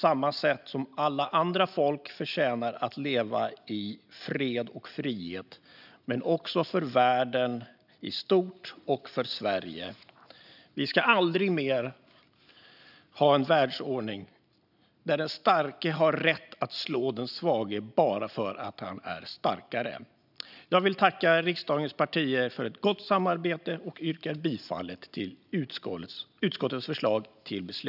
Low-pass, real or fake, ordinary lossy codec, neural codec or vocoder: 5.4 kHz; real; none; none